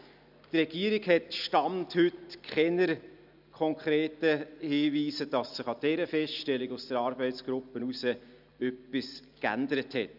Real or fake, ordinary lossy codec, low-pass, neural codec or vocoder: real; none; 5.4 kHz; none